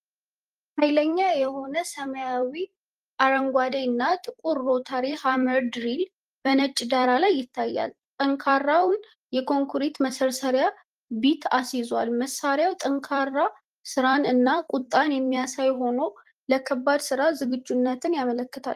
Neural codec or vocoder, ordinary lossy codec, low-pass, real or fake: vocoder, 44.1 kHz, 128 mel bands every 256 samples, BigVGAN v2; Opus, 24 kbps; 14.4 kHz; fake